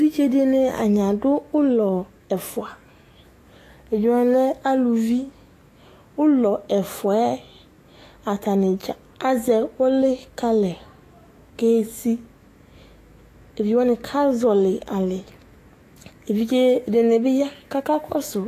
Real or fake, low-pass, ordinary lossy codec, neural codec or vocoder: fake; 14.4 kHz; AAC, 48 kbps; autoencoder, 48 kHz, 128 numbers a frame, DAC-VAE, trained on Japanese speech